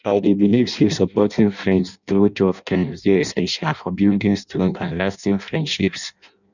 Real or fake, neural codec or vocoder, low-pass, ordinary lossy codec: fake; codec, 16 kHz in and 24 kHz out, 0.6 kbps, FireRedTTS-2 codec; 7.2 kHz; none